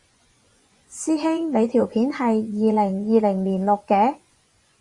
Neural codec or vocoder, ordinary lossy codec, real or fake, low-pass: vocoder, 24 kHz, 100 mel bands, Vocos; Opus, 64 kbps; fake; 10.8 kHz